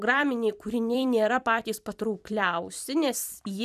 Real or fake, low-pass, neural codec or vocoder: fake; 14.4 kHz; vocoder, 44.1 kHz, 128 mel bands every 256 samples, BigVGAN v2